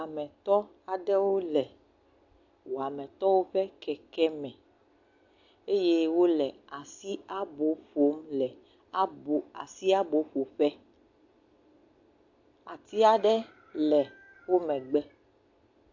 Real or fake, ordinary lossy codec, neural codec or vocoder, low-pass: real; AAC, 48 kbps; none; 7.2 kHz